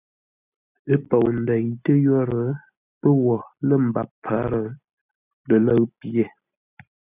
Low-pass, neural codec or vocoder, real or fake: 3.6 kHz; none; real